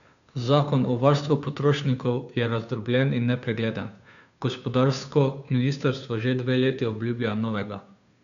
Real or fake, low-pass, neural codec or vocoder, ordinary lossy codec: fake; 7.2 kHz; codec, 16 kHz, 2 kbps, FunCodec, trained on Chinese and English, 25 frames a second; none